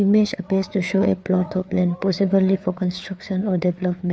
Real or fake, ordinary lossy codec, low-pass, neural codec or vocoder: fake; none; none; codec, 16 kHz, 4 kbps, FreqCodec, larger model